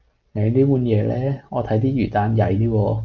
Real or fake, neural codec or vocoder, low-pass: real; none; 7.2 kHz